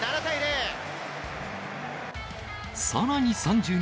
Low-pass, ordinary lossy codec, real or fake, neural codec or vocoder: none; none; real; none